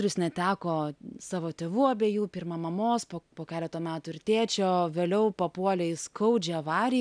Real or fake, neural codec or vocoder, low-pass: real; none; 9.9 kHz